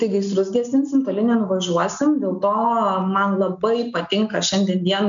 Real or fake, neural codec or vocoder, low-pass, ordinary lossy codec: real; none; 7.2 kHz; MP3, 64 kbps